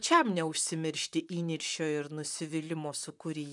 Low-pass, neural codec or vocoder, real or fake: 10.8 kHz; vocoder, 44.1 kHz, 128 mel bands, Pupu-Vocoder; fake